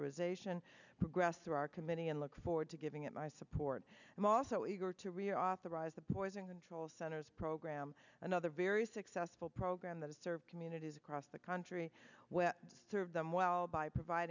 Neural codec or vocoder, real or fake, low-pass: none; real; 7.2 kHz